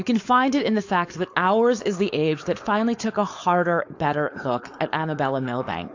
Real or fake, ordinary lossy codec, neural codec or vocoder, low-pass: fake; AAC, 48 kbps; codec, 16 kHz, 4.8 kbps, FACodec; 7.2 kHz